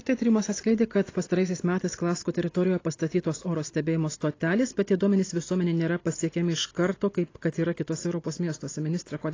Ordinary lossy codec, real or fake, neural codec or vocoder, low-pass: AAC, 32 kbps; real; none; 7.2 kHz